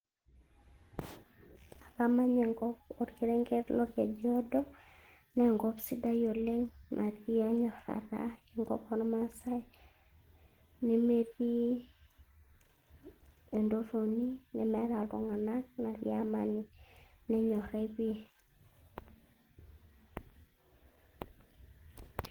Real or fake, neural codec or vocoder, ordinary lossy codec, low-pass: real; none; Opus, 16 kbps; 19.8 kHz